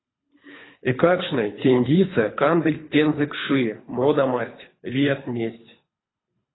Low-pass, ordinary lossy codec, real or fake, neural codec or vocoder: 7.2 kHz; AAC, 16 kbps; fake; codec, 24 kHz, 3 kbps, HILCodec